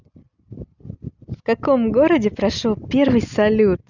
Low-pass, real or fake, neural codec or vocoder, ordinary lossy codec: 7.2 kHz; real; none; none